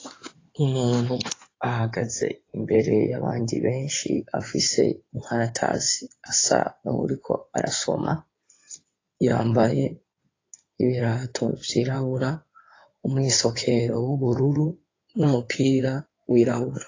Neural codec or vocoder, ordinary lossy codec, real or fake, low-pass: codec, 16 kHz in and 24 kHz out, 2.2 kbps, FireRedTTS-2 codec; AAC, 32 kbps; fake; 7.2 kHz